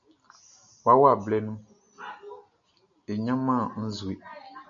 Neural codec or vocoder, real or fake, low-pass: none; real; 7.2 kHz